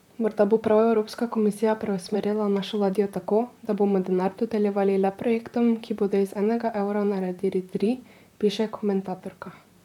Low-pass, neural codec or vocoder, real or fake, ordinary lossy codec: 19.8 kHz; vocoder, 44.1 kHz, 128 mel bands, Pupu-Vocoder; fake; none